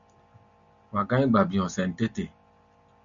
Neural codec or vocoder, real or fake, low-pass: none; real; 7.2 kHz